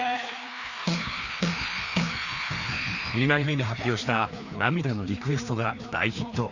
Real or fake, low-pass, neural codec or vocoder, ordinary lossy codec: fake; 7.2 kHz; codec, 16 kHz, 2 kbps, FreqCodec, larger model; none